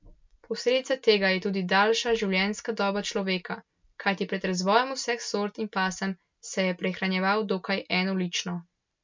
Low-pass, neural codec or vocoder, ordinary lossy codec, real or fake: 7.2 kHz; none; MP3, 64 kbps; real